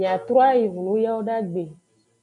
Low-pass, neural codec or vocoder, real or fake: 10.8 kHz; none; real